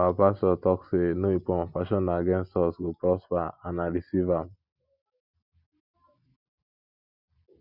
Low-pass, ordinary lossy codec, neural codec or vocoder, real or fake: 5.4 kHz; Opus, 64 kbps; none; real